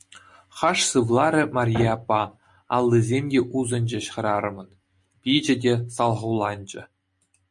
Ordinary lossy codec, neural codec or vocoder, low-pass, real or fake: MP3, 48 kbps; none; 10.8 kHz; real